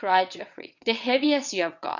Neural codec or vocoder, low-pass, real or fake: vocoder, 22.05 kHz, 80 mel bands, WaveNeXt; 7.2 kHz; fake